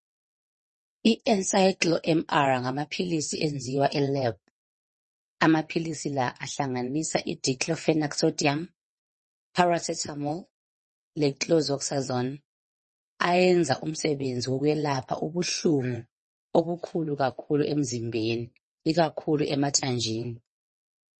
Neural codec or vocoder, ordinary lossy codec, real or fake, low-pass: vocoder, 22.05 kHz, 80 mel bands, WaveNeXt; MP3, 32 kbps; fake; 9.9 kHz